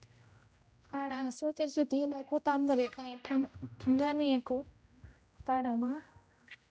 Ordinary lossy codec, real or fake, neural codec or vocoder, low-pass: none; fake; codec, 16 kHz, 0.5 kbps, X-Codec, HuBERT features, trained on general audio; none